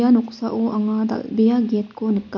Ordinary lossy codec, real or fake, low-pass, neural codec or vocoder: MP3, 48 kbps; real; 7.2 kHz; none